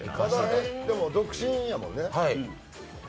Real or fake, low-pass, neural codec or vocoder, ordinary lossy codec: real; none; none; none